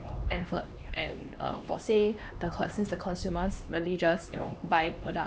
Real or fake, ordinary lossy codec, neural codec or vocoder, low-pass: fake; none; codec, 16 kHz, 2 kbps, X-Codec, HuBERT features, trained on LibriSpeech; none